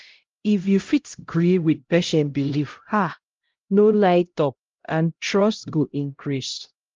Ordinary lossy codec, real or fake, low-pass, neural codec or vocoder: Opus, 32 kbps; fake; 7.2 kHz; codec, 16 kHz, 0.5 kbps, X-Codec, HuBERT features, trained on LibriSpeech